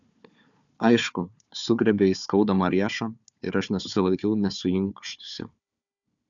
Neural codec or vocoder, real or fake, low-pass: codec, 16 kHz, 4 kbps, FunCodec, trained on Chinese and English, 50 frames a second; fake; 7.2 kHz